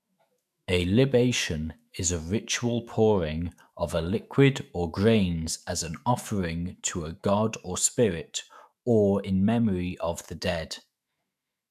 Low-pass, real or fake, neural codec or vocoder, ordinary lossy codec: 14.4 kHz; fake; autoencoder, 48 kHz, 128 numbers a frame, DAC-VAE, trained on Japanese speech; AAC, 96 kbps